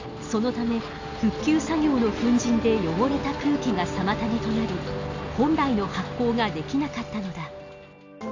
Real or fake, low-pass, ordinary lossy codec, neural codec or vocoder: real; 7.2 kHz; AAC, 48 kbps; none